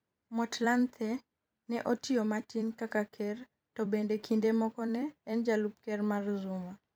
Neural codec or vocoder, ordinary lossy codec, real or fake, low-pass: vocoder, 44.1 kHz, 128 mel bands every 256 samples, BigVGAN v2; none; fake; none